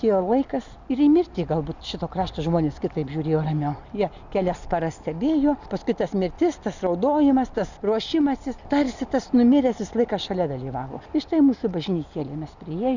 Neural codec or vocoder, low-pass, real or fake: none; 7.2 kHz; real